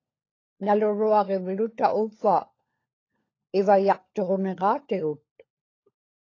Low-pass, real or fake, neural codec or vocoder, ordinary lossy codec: 7.2 kHz; fake; codec, 16 kHz, 16 kbps, FunCodec, trained on LibriTTS, 50 frames a second; AAC, 32 kbps